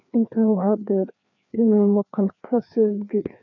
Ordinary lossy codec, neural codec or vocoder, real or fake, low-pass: none; codec, 16 kHz, 2 kbps, FreqCodec, larger model; fake; 7.2 kHz